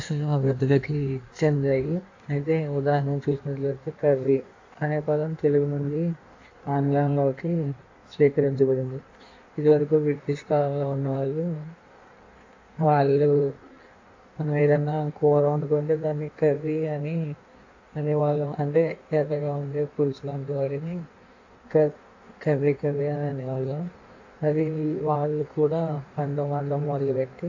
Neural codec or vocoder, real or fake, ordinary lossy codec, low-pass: codec, 16 kHz in and 24 kHz out, 1.1 kbps, FireRedTTS-2 codec; fake; AAC, 48 kbps; 7.2 kHz